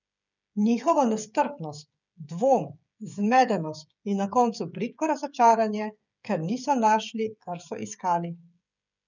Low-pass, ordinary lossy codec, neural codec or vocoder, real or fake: 7.2 kHz; none; codec, 16 kHz, 16 kbps, FreqCodec, smaller model; fake